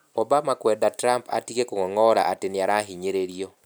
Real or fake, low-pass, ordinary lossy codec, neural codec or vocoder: real; none; none; none